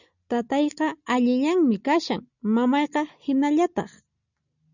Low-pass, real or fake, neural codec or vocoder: 7.2 kHz; real; none